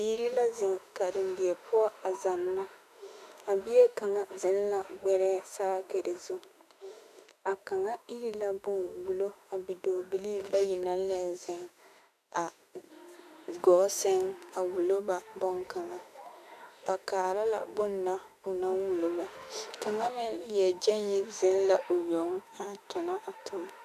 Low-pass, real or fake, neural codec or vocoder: 14.4 kHz; fake; autoencoder, 48 kHz, 32 numbers a frame, DAC-VAE, trained on Japanese speech